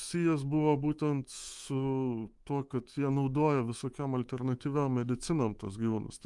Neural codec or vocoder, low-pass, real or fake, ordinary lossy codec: codec, 24 kHz, 3.1 kbps, DualCodec; 10.8 kHz; fake; Opus, 32 kbps